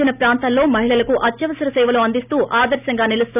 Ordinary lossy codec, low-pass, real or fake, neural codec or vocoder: none; 3.6 kHz; real; none